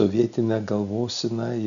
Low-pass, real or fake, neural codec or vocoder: 7.2 kHz; real; none